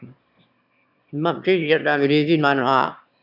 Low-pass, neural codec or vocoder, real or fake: 5.4 kHz; autoencoder, 22.05 kHz, a latent of 192 numbers a frame, VITS, trained on one speaker; fake